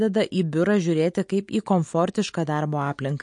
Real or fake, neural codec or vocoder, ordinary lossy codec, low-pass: real; none; MP3, 48 kbps; 10.8 kHz